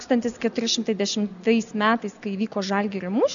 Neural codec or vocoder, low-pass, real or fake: none; 7.2 kHz; real